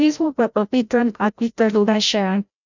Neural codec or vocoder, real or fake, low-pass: codec, 16 kHz, 0.5 kbps, FreqCodec, larger model; fake; 7.2 kHz